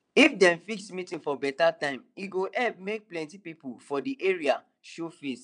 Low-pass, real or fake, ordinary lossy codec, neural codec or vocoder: 9.9 kHz; fake; none; vocoder, 22.05 kHz, 80 mel bands, WaveNeXt